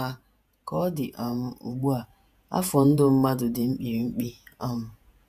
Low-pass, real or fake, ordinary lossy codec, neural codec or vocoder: 14.4 kHz; fake; none; vocoder, 48 kHz, 128 mel bands, Vocos